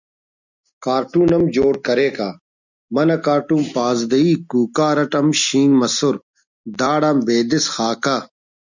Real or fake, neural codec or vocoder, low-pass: real; none; 7.2 kHz